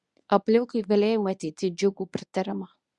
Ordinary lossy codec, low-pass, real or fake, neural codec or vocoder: Opus, 64 kbps; 10.8 kHz; fake; codec, 24 kHz, 0.9 kbps, WavTokenizer, medium speech release version 1